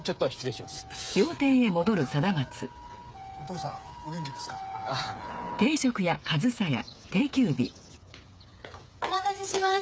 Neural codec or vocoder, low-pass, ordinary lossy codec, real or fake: codec, 16 kHz, 8 kbps, FreqCodec, smaller model; none; none; fake